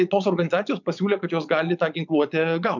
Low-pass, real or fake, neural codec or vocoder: 7.2 kHz; fake; vocoder, 22.05 kHz, 80 mel bands, Vocos